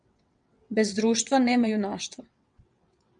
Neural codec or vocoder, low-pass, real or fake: vocoder, 22.05 kHz, 80 mel bands, WaveNeXt; 9.9 kHz; fake